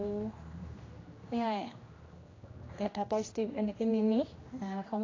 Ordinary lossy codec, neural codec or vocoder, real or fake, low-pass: AAC, 32 kbps; codec, 16 kHz, 1 kbps, X-Codec, HuBERT features, trained on general audio; fake; 7.2 kHz